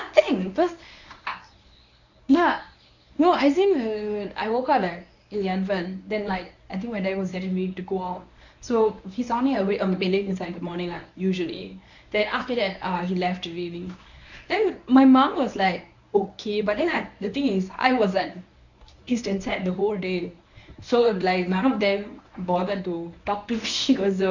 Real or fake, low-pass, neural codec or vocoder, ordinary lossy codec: fake; 7.2 kHz; codec, 24 kHz, 0.9 kbps, WavTokenizer, medium speech release version 1; none